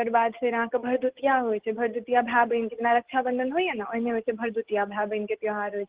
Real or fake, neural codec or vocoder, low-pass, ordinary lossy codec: real; none; 3.6 kHz; Opus, 16 kbps